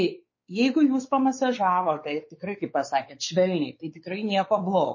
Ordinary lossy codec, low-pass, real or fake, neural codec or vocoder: MP3, 32 kbps; 7.2 kHz; fake; codec, 16 kHz, 4 kbps, X-Codec, WavLM features, trained on Multilingual LibriSpeech